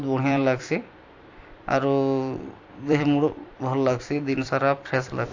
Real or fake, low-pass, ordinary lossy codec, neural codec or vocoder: fake; 7.2 kHz; AAC, 48 kbps; vocoder, 44.1 kHz, 128 mel bands every 256 samples, BigVGAN v2